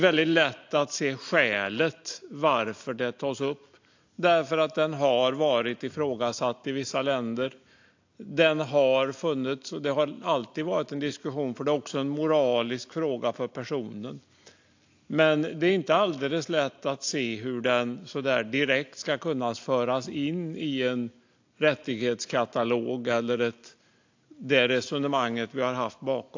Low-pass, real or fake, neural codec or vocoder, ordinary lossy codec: 7.2 kHz; real; none; AAC, 48 kbps